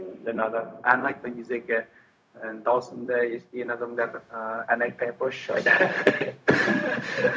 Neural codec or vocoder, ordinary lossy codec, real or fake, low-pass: codec, 16 kHz, 0.4 kbps, LongCat-Audio-Codec; none; fake; none